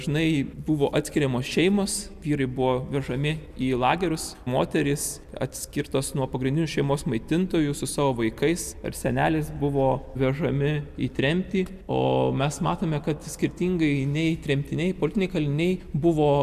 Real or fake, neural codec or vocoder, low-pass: real; none; 14.4 kHz